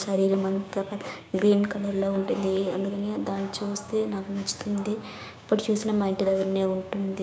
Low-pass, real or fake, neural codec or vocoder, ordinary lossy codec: none; fake; codec, 16 kHz, 6 kbps, DAC; none